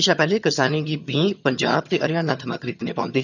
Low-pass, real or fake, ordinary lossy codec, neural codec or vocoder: 7.2 kHz; fake; none; vocoder, 22.05 kHz, 80 mel bands, HiFi-GAN